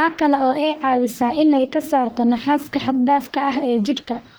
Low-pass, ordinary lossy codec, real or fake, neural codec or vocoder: none; none; fake; codec, 44.1 kHz, 1.7 kbps, Pupu-Codec